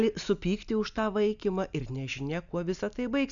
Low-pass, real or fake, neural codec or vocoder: 7.2 kHz; real; none